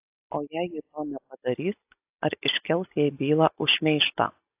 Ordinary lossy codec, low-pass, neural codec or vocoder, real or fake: AAC, 32 kbps; 3.6 kHz; none; real